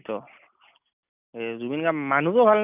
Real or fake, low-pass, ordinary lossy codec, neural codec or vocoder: real; 3.6 kHz; none; none